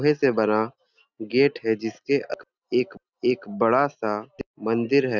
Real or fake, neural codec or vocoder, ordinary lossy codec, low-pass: real; none; Opus, 64 kbps; 7.2 kHz